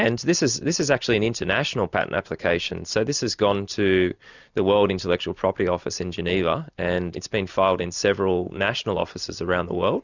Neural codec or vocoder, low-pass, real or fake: none; 7.2 kHz; real